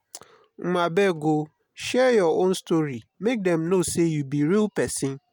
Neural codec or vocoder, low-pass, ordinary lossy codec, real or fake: none; none; none; real